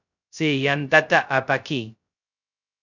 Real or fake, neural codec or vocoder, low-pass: fake; codec, 16 kHz, 0.2 kbps, FocalCodec; 7.2 kHz